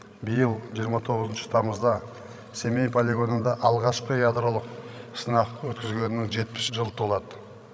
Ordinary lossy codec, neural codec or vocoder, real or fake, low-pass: none; codec, 16 kHz, 16 kbps, FreqCodec, larger model; fake; none